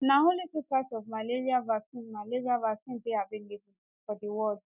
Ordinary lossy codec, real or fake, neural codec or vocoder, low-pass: none; real; none; 3.6 kHz